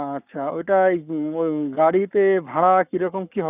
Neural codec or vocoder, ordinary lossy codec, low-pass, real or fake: codec, 44.1 kHz, 7.8 kbps, Pupu-Codec; none; 3.6 kHz; fake